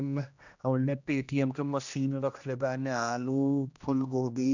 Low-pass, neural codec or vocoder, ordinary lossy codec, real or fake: 7.2 kHz; codec, 16 kHz, 1 kbps, X-Codec, HuBERT features, trained on general audio; none; fake